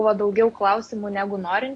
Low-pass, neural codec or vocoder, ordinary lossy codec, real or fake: 10.8 kHz; none; AAC, 48 kbps; real